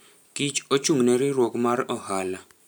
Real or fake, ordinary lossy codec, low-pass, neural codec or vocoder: fake; none; none; vocoder, 44.1 kHz, 128 mel bands every 512 samples, BigVGAN v2